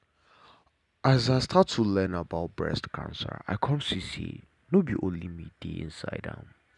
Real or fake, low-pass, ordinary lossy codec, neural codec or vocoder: real; 10.8 kHz; none; none